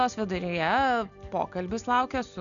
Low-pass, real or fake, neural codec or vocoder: 7.2 kHz; real; none